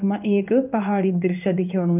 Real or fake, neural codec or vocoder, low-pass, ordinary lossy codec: fake; codec, 16 kHz in and 24 kHz out, 1 kbps, XY-Tokenizer; 3.6 kHz; none